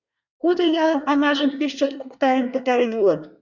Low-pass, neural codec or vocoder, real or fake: 7.2 kHz; codec, 24 kHz, 1 kbps, SNAC; fake